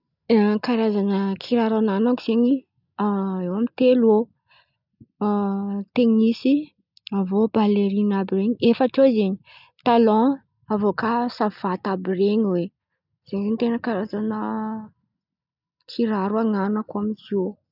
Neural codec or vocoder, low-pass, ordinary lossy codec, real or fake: none; 5.4 kHz; none; real